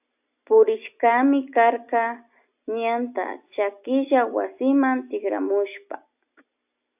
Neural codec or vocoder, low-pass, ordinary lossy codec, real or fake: none; 3.6 kHz; AAC, 32 kbps; real